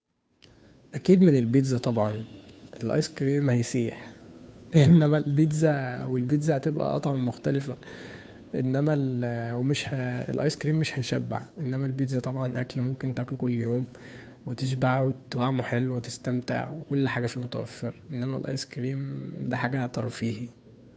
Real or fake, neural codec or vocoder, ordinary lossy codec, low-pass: fake; codec, 16 kHz, 2 kbps, FunCodec, trained on Chinese and English, 25 frames a second; none; none